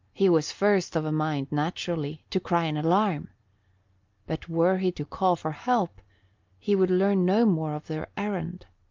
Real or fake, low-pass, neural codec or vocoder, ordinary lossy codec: real; 7.2 kHz; none; Opus, 24 kbps